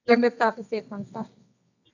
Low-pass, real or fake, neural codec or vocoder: 7.2 kHz; fake; codec, 24 kHz, 0.9 kbps, WavTokenizer, medium music audio release